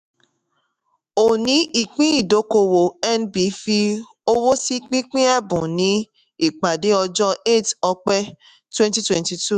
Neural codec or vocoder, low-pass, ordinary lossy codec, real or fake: autoencoder, 48 kHz, 128 numbers a frame, DAC-VAE, trained on Japanese speech; 14.4 kHz; none; fake